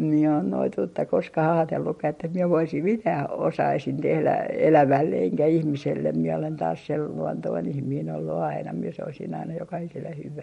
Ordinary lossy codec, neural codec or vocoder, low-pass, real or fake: MP3, 48 kbps; none; 19.8 kHz; real